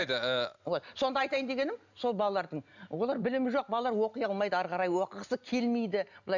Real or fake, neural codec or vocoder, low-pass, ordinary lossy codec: real; none; 7.2 kHz; none